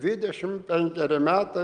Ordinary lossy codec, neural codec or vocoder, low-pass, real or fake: Opus, 24 kbps; none; 9.9 kHz; real